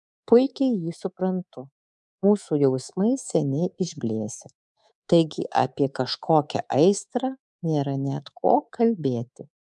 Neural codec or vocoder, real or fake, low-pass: codec, 24 kHz, 3.1 kbps, DualCodec; fake; 10.8 kHz